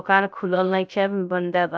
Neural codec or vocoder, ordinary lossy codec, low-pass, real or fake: codec, 16 kHz, 0.3 kbps, FocalCodec; none; none; fake